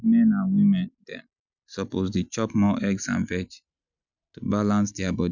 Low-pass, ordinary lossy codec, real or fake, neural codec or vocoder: 7.2 kHz; none; real; none